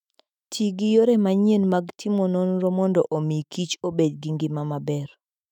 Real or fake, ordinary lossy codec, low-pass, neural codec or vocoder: fake; none; 19.8 kHz; autoencoder, 48 kHz, 128 numbers a frame, DAC-VAE, trained on Japanese speech